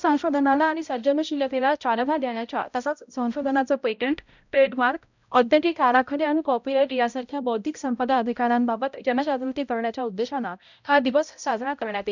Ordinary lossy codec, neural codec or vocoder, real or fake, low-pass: none; codec, 16 kHz, 0.5 kbps, X-Codec, HuBERT features, trained on balanced general audio; fake; 7.2 kHz